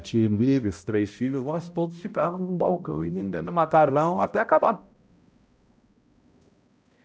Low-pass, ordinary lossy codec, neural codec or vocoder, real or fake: none; none; codec, 16 kHz, 0.5 kbps, X-Codec, HuBERT features, trained on balanced general audio; fake